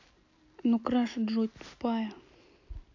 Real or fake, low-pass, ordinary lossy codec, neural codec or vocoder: real; 7.2 kHz; AAC, 48 kbps; none